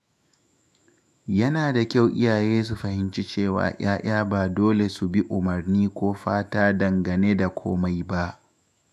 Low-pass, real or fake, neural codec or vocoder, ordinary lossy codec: 14.4 kHz; fake; autoencoder, 48 kHz, 128 numbers a frame, DAC-VAE, trained on Japanese speech; none